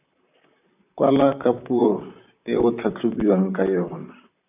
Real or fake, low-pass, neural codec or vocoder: fake; 3.6 kHz; vocoder, 44.1 kHz, 128 mel bands, Pupu-Vocoder